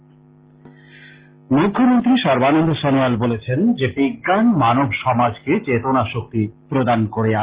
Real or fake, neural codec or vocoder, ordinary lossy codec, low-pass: real; none; Opus, 16 kbps; 3.6 kHz